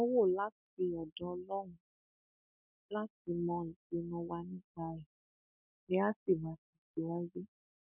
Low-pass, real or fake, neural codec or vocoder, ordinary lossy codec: 3.6 kHz; real; none; none